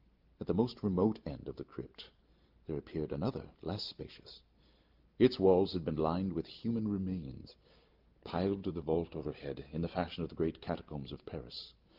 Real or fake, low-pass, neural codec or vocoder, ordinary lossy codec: real; 5.4 kHz; none; Opus, 24 kbps